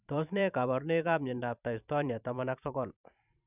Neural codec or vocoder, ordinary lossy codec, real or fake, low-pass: vocoder, 44.1 kHz, 128 mel bands every 512 samples, BigVGAN v2; none; fake; 3.6 kHz